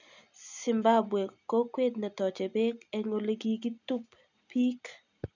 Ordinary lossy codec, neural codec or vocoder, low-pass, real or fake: none; none; 7.2 kHz; real